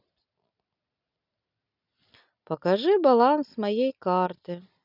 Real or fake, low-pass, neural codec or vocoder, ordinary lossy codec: real; 5.4 kHz; none; none